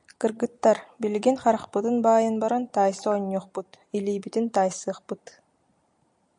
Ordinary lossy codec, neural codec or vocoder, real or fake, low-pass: MP3, 64 kbps; none; real; 9.9 kHz